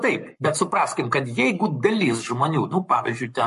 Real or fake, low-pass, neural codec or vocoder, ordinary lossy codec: fake; 14.4 kHz; codec, 44.1 kHz, 7.8 kbps, Pupu-Codec; MP3, 48 kbps